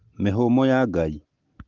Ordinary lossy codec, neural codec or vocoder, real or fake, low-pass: Opus, 16 kbps; none; real; 7.2 kHz